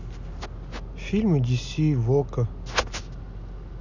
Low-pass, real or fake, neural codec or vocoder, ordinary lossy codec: 7.2 kHz; real; none; none